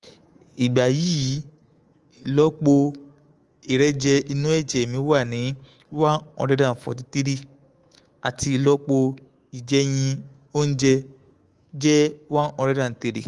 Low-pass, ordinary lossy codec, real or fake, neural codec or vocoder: 10.8 kHz; Opus, 24 kbps; fake; codec, 24 kHz, 3.1 kbps, DualCodec